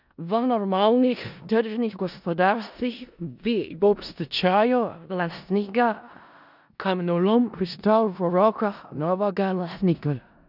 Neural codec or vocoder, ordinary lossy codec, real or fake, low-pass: codec, 16 kHz in and 24 kHz out, 0.4 kbps, LongCat-Audio-Codec, four codebook decoder; none; fake; 5.4 kHz